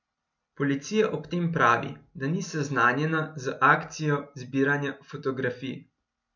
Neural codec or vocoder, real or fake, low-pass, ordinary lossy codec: none; real; 7.2 kHz; none